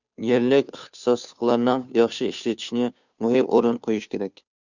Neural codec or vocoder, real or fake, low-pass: codec, 16 kHz, 2 kbps, FunCodec, trained on Chinese and English, 25 frames a second; fake; 7.2 kHz